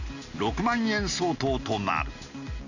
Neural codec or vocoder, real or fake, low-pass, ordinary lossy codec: none; real; 7.2 kHz; none